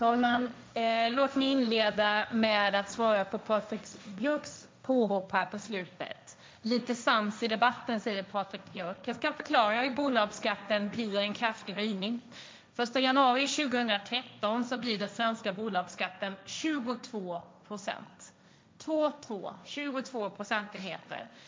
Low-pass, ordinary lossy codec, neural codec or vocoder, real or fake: none; none; codec, 16 kHz, 1.1 kbps, Voila-Tokenizer; fake